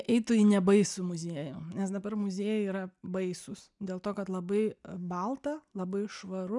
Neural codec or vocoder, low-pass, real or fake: none; 10.8 kHz; real